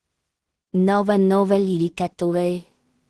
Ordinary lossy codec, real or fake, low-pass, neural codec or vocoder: Opus, 16 kbps; fake; 10.8 kHz; codec, 16 kHz in and 24 kHz out, 0.4 kbps, LongCat-Audio-Codec, two codebook decoder